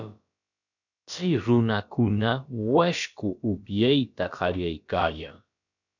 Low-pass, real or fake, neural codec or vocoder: 7.2 kHz; fake; codec, 16 kHz, about 1 kbps, DyCAST, with the encoder's durations